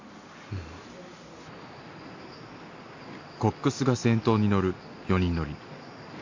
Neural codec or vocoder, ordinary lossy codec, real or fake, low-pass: none; AAC, 48 kbps; real; 7.2 kHz